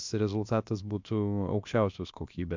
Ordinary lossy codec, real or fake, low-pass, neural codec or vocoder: MP3, 64 kbps; fake; 7.2 kHz; codec, 16 kHz, about 1 kbps, DyCAST, with the encoder's durations